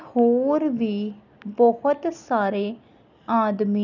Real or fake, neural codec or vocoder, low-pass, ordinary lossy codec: real; none; 7.2 kHz; none